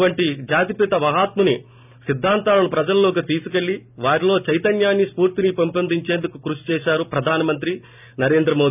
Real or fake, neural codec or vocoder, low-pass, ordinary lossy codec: real; none; 3.6 kHz; none